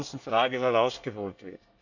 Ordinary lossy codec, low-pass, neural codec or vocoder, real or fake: none; 7.2 kHz; codec, 24 kHz, 1 kbps, SNAC; fake